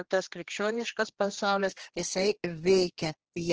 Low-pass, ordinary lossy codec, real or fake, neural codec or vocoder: 7.2 kHz; Opus, 16 kbps; fake; codec, 16 kHz, 2 kbps, X-Codec, HuBERT features, trained on general audio